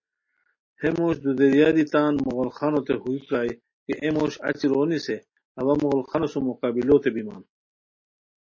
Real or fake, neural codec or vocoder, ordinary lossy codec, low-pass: real; none; MP3, 32 kbps; 7.2 kHz